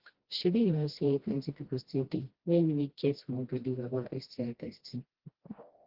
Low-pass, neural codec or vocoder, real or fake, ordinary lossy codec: 5.4 kHz; codec, 16 kHz, 1 kbps, FreqCodec, smaller model; fake; Opus, 16 kbps